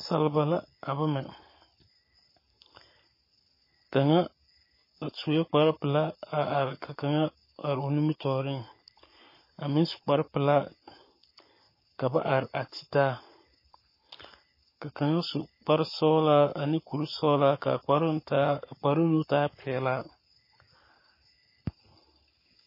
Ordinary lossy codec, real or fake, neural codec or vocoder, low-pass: MP3, 24 kbps; fake; codec, 44.1 kHz, 7.8 kbps, Pupu-Codec; 5.4 kHz